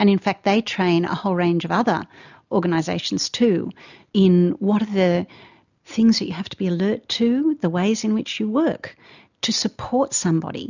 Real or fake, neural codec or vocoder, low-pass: real; none; 7.2 kHz